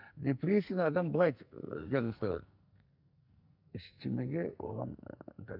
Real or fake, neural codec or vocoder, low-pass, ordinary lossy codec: fake; codec, 44.1 kHz, 2.6 kbps, SNAC; 5.4 kHz; none